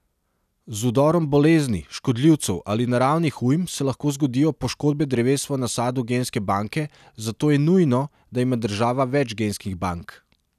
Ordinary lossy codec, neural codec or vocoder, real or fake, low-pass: none; none; real; 14.4 kHz